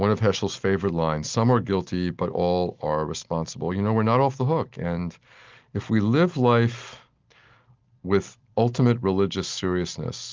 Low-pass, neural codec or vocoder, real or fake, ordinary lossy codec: 7.2 kHz; none; real; Opus, 32 kbps